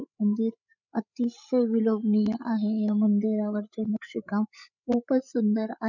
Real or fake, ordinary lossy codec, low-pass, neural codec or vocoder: fake; none; 7.2 kHz; codec, 16 kHz, 8 kbps, FreqCodec, larger model